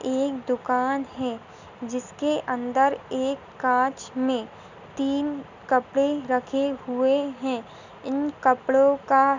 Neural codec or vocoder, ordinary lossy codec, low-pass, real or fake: none; none; 7.2 kHz; real